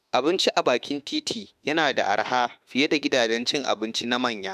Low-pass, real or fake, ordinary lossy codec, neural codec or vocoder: 14.4 kHz; fake; none; autoencoder, 48 kHz, 32 numbers a frame, DAC-VAE, trained on Japanese speech